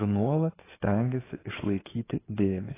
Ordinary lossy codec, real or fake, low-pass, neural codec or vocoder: AAC, 16 kbps; fake; 3.6 kHz; codec, 16 kHz in and 24 kHz out, 2.2 kbps, FireRedTTS-2 codec